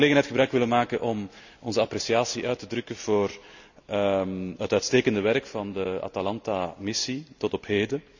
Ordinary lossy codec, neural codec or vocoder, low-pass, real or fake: none; none; 7.2 kHz; real